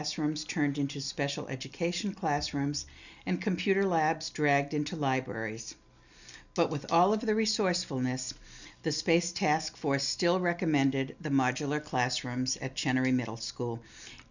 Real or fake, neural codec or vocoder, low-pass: real; none; 7.2 kHz